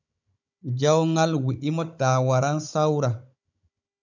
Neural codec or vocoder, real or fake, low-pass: codec, 16 kHz, 16 kbps, FunCodec, trained on Chinese and English, 50 frames a second; fake; 7.2 kHz